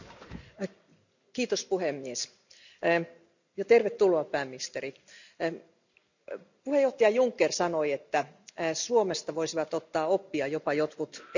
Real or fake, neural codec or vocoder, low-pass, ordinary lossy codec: real; none; 7.2 kHz; none